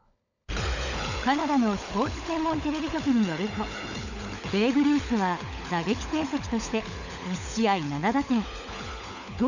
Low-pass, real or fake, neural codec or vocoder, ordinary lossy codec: 7.2 kHz; fake; codec, 16 kHz, 16 kbps, FunCodec, trained on LibriTTS, 50 frames a second; none